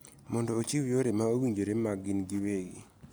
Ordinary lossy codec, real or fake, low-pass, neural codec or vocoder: none; real; none; none